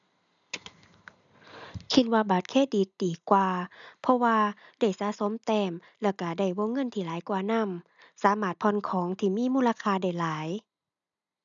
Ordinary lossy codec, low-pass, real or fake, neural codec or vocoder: none; 7.2 kHz; real; none